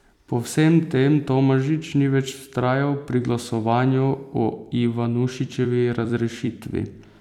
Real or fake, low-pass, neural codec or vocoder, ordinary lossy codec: fake; 19.8 kHz; vocoder, 44.1 kHz, 128 mel bands every 256 samples, BigVGAN v2; none